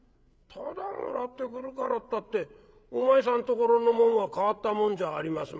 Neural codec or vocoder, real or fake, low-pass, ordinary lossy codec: codec, 16 kHz, 8 kbps, FreqCodec, larger model; fake; none; none